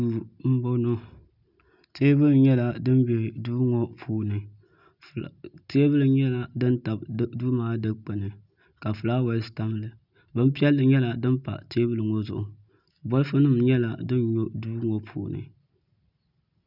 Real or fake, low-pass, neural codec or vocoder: real; 5.4 kHz; none